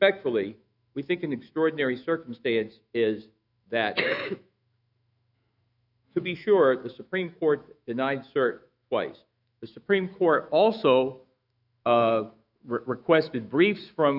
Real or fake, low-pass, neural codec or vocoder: fake; 5.4 kHz; autoencoder, 48 kHz, 128 numbers a frame, DAC-VAE, trained on Japanese speech